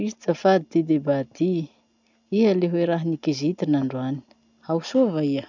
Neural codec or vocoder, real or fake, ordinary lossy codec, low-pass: none; real; none; 7.2 kHz